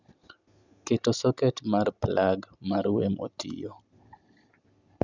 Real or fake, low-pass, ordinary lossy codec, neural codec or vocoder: real; 7.2 kHz; Opus, 64 kbps; none